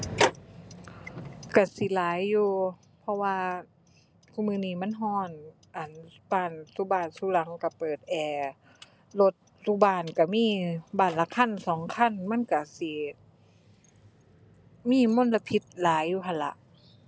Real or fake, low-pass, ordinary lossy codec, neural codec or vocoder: real; none; none; none